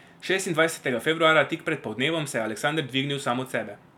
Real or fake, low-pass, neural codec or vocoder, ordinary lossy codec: real; 19.8 kHz; none; none